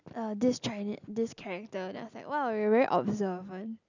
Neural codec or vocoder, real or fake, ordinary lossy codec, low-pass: none; real; none; 7.2 kHz